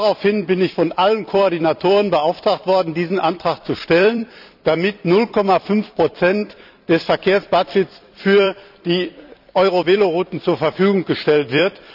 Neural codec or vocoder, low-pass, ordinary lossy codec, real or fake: vocoder, 44.1 kHz, 128 mel bands every 256 samples, BigVGAN v2; 5.4 kHz; none; fake